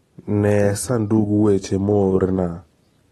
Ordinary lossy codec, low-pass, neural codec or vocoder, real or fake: AAC, 32 kbps; 19.8 kHz; none; real